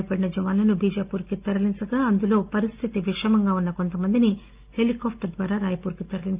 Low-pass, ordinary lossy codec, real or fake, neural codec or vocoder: 3.6 kHz; Opus, 24 kbps; real; none